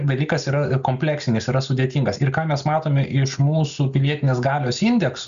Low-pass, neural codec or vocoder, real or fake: 7.2 kHz; none; real